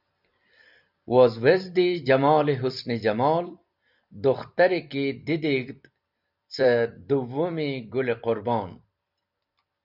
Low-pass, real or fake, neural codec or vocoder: 5.4 kHz; fake; vocoder, 44.1 kHz, 128 mel bands every 256 samples, BigVGAN v2